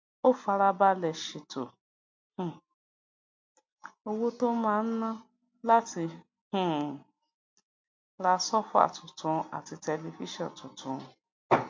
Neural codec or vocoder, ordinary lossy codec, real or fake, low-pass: none; MP3, 48 kbps; real; 7.2 kHz